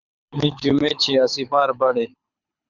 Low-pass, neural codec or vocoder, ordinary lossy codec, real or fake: 7.2 kHz; codec, 24 kHz, 6 kbps, HILCodec; Opus, 64 kbps; fake